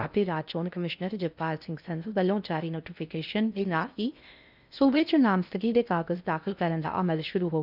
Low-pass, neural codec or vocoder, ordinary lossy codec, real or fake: 5.4 kHz; codec, 16 kHz in and 24 kHz out, 0.6 kbps, FocalCodec, streaming, 4096 codes; none; fake